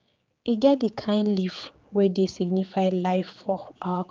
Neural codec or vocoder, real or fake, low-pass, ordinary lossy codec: codec, 16 kHz, 4 kbps, X-Codec, HuBERT features, trained on general audio; fake; 7.2 kHz; Opus, 24 kbps